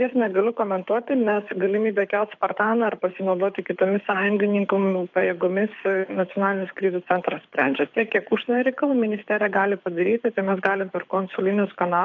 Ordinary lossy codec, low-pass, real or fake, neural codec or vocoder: AAC, 48 kbps; 7.2 kHz; fake; codec, 24 kHz, 6 kbps, HILCodec